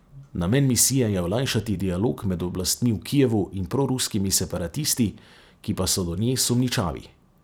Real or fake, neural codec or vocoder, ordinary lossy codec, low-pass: fake; vocoder, 44.1 kHz, 128 mel bands every 256 samples, BigVGAN v2; none; none